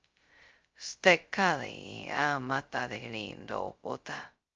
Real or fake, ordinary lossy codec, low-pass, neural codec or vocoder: fake; Opus, 24 kbps; 7.2 kHz; codec, 16 kHz, 0.2 kbps, FocalCodec